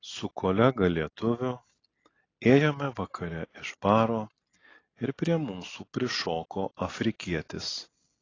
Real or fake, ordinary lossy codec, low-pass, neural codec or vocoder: real; AAC, 32 kbps; 7.2 kHz; none